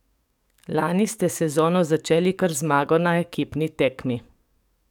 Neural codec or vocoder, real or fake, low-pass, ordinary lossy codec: codec, 44.1 kHz, 7.8 kbps, DAC; fake; 19.8 kHz; none